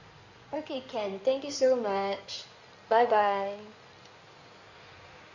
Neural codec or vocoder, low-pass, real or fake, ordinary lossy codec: codec, 16 kHz in and 24 kHz out, 2.2 kbps, FireRedTTS-2 codec; 7.2 kHz; fake; none